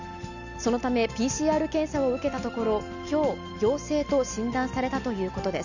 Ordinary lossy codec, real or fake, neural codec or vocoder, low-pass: none; real; none; 7.2 kHz